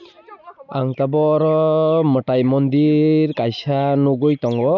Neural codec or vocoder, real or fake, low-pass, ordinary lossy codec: none; real; 7.2 kHz; none